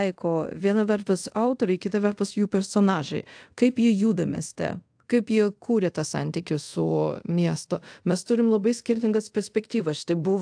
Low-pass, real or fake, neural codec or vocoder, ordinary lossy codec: 9.9 kHz; fake; codec, 24 kHz, 0.5 kbps, DualCodec; AAC, 64 kbps